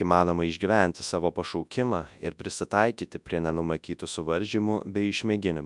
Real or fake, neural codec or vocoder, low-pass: fake; codec, 24 kHz, 0.9 kbps, WavTokenizer, large speech release; 10.8 kHz